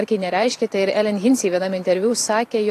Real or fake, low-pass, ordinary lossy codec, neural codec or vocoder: fake; 14.4 kHz; AAC, 48 kbps; vocoder, 44.1 kHz, 128 mel bands every 512 samples, BigVGAN v2